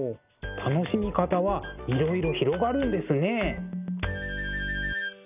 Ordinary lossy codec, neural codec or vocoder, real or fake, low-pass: none; none; real; 3.6 kHz